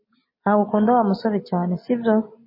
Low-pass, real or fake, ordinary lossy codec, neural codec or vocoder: 5.4 kHz; real; MP3, 24 kbps; none